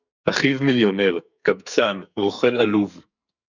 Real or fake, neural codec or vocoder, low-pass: fake; codec, 44.1 kHz, 2.6 kbps, SNAC; 7.2 kHz